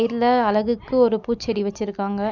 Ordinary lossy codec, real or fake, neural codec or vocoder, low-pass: none; real; none; 7.2 kHz